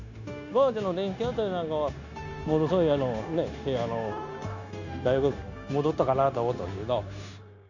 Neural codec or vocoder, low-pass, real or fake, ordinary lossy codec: codec, 16 kHz, 0.9 kbps, LongCat-Audio-Codec; 7.2 kHz; fake; none